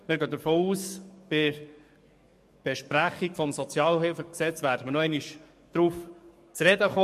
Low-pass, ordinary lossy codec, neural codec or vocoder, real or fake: 14.4 kHz; MP3, 64 kbps; codec, 44.1 kHz, 7.8 kbps, DAC; fake